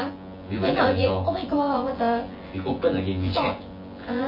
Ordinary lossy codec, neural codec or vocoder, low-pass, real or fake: MP3, 24 kbps; vocoder, 24 kHz, 100 mel bands, Vocos; 5.4 kHz; fake